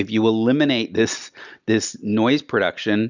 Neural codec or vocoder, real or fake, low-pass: none; real; 7.2 kHz